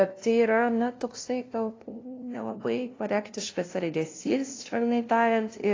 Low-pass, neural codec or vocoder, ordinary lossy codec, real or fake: 7.2 kHz; codec, 16 kHz, 0.5 kbps, FunCodec, trained on LibriTTS, 25 frames a second; AAC, 32 kbps; fake